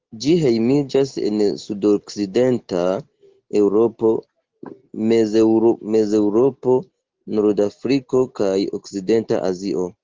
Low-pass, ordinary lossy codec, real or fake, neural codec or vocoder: 7.2 kHz; Opus, 16 kbps; real; none